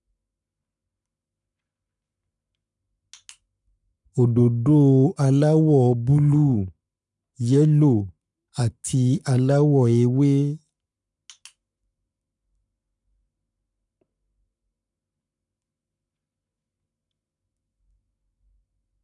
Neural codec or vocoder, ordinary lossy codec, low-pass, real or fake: codec, 44.1 kHz, 7.8 kbps, Pupu-Codec; none; 10.8 kHz; fake